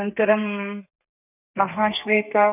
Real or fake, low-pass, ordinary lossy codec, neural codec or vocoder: fake; 3.6 kHz; none; codec, 32 kHz, 1.9 kbps, SNAC